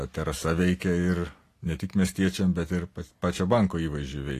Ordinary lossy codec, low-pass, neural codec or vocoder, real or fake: AAC, 48 kbps; 14.4 kHz; none; real